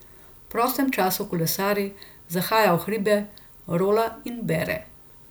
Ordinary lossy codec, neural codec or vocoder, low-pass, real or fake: none; none; none; real